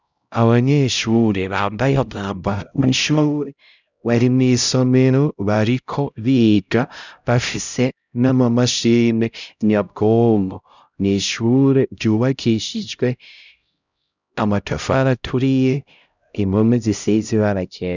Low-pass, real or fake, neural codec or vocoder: 7.2 kHz; fake; codec, 16 kHz, 0.5 kbps, X-Codec, HuBERT features, trained on LibriSpeech